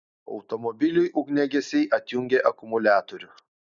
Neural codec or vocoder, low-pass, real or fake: none; 7.2 kHz; real